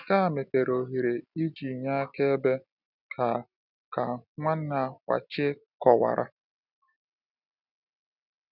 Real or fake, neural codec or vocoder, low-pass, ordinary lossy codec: real; none; 5.4 kHz; none